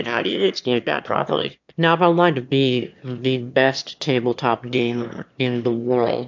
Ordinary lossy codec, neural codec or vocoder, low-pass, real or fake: MP3, 64 kbps; autoencoder, 22.05 kHz, a latent of 192 numbers a frame, VITS, trained on one speaker; 7.2 kHz; fake